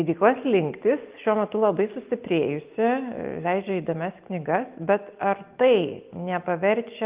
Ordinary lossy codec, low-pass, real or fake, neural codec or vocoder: Opus, 32 kbps; 3.6 kHz; real; none